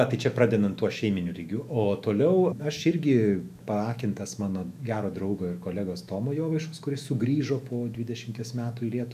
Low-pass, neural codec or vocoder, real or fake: 14.4 kHz; autoencoder, 48 kHz, 128 numbers a frame, DAC-VAE, trained on Japanese speech; fake